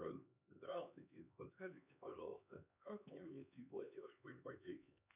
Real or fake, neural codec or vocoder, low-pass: fake; codec, 16 kHz, 2 kbps, X-Codec, HuBERT features, trained on LibriSpeech; 3.6 kHz